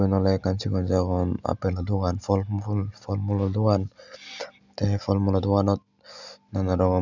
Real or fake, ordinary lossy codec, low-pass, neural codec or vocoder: real; none; 7.2 kHz; none